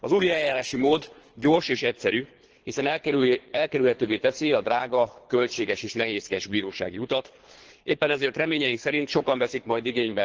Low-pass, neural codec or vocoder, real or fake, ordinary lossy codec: 7.2 kHz; codec, 24 kHz, 3 kbps, HILCodec; fake; Opus, 16 kbps